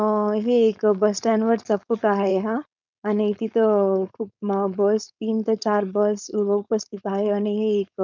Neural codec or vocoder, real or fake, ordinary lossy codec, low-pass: codec, 16 kHz, 4.8 kbps, FACodec; fake; none; 7.2 kHz